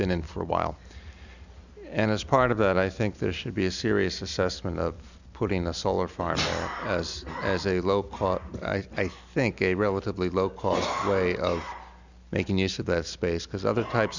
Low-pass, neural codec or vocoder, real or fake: 7.2 kHz; none; real